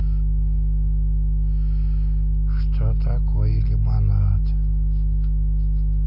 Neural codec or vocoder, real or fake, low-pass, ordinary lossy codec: none; real; 5.4 kHz; none